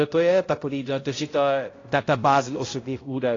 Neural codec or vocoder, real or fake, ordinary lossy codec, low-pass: codec, 16 kHz, 0.5 kbps, X-Codec, HuBERT features, trained on balanced general audio; fake; AAC, 32 kbps; 7.2 kHz